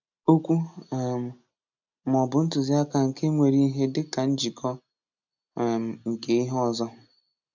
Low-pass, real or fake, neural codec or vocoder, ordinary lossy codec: 7.2 kHz; real; none; none